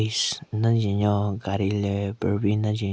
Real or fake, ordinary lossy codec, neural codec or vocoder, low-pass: real; none; none; none